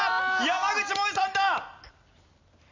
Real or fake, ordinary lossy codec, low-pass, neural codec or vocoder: real; MP3, 64 kbps; 7.2 kHz; none